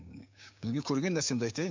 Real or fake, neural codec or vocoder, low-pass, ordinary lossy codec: fake; codec, 16 kHz, 6 kbps, DAC; 7.2 kHz; MP3, 64 kbps